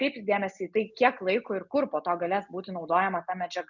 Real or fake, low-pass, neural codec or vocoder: real; 7.2 kHz; none